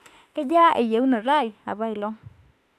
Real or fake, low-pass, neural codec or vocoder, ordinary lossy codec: fake; 14.4 kHz; autoencoder, 48 kHz, 32 numbers a frame, DAC-VAE, trained on Japanese speech; none